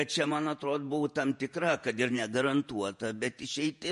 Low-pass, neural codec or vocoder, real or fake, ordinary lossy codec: 14.4 kHz; vocoder, 48 kHz, 128 mel bands, Vocos; fake; MP3, 48 kbps